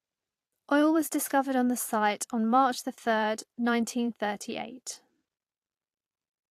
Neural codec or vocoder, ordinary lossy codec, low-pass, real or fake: vocoder, 44.1 kHz, 128 mel bands every 512 samples, BigVGAN v2; AAC, 64 kbps; 14.4 kHz; fake